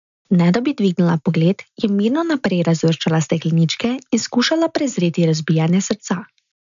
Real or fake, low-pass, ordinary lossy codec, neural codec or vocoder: real; 7.2 kHz; none; none